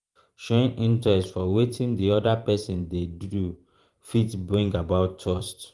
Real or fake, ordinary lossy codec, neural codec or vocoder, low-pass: fake; Opus, 24 kbps; vocoder, 44.1 kHz, 128 mel bands every 512 samples, BigVGAN v2; 10.8 kHz